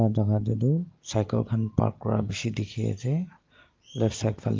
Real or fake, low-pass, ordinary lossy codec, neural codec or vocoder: real; 7.2 kHz; Opus, 32 kbps; none